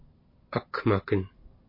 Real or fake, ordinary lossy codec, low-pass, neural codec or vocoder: real; MP3, 32 kbps; 5.4 kHz; none